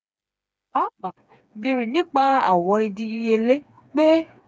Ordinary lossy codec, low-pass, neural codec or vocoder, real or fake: none; none; codec, 16 kHz, 4 kbps, FreqCodec, smaller model; fake